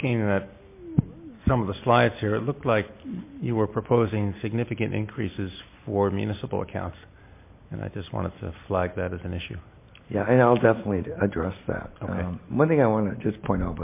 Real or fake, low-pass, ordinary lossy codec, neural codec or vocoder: real; 3.6 kHz; MP3, 24 kbps; none